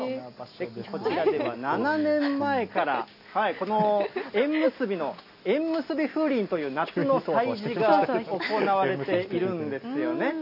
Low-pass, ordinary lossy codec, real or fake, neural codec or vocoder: 5.4 kHz; none; real; none